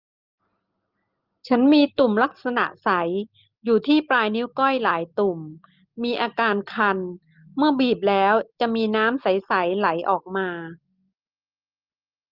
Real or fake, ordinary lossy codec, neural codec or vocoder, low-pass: real; Opus, 24 kbps; none; 5.4 kHz